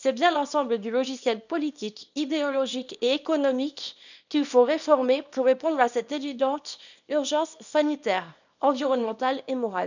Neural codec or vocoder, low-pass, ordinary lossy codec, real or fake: codec, 24 kHz, 0.9 kbps, WavTokenizer, small release; 7.2 kHz; none; fake